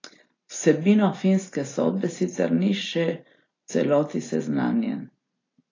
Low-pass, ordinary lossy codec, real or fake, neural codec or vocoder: 7.2 kHz; AAC, 32 kbps; real; none